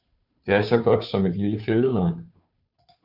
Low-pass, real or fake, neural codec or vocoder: 5.4 kHz; fake; codec, 16 kHz, 2 kbps, FunCodec, trained on Chinese and English, 25 frames a second